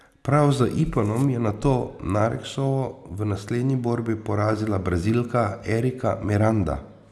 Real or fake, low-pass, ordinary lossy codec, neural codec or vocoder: real; none; none; none